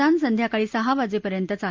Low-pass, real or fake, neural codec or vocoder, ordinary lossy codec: 7.2 kHz; real; none; Opus, 24 kbps